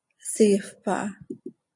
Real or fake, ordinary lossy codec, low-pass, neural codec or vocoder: real; AAC, 64 kbps; 10.8 kHz; none